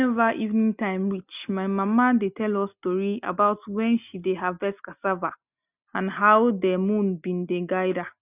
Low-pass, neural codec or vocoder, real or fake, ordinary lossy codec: 3.6 kHz; none; real; none